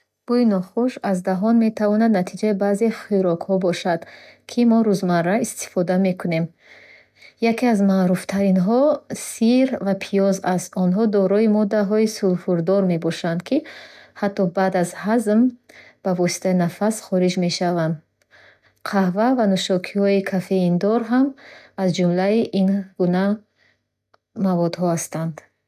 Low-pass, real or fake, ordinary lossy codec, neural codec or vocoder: 14.4 kHz; real; none; none